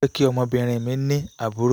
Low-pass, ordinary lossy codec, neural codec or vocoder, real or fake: none; none; none; real